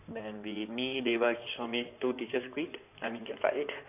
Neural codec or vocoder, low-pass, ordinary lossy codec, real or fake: codec, 16 kHz in and 24 kHz out, 2.2 kbps, FireRedTTS-2 codec; 3.6 kHz; none; fake